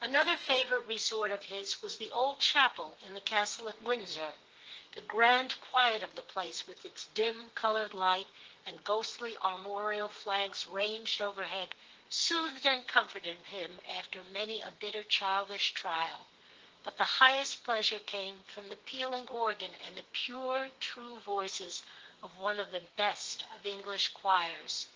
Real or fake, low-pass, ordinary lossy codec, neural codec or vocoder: fake; 7.2 kHz; Opus, 16 kbps; codec, 32 kHz, 1.9 kbps, SNAC